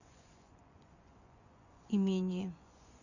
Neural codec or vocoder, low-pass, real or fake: vocoder, 24 kHz, 100 mel bands, Vocos; 7.2 kHz; fake